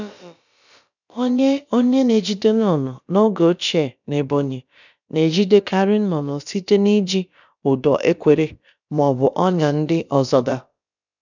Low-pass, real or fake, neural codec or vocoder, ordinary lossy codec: 7.2 kHz; fake; codec, 16 kHz, about 1 kbps, DyCAST, with the encoder's durations; none